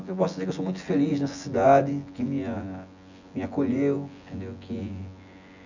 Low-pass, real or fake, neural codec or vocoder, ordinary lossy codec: 7.2 kHz; fake; vocoder, 24 kHz, 100 mel bands, Vocos; none